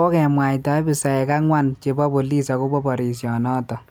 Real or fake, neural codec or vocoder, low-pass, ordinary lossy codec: real; none; none; none